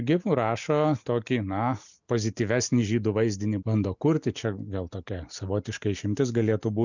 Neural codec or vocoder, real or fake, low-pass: none; real; 7.2 kHz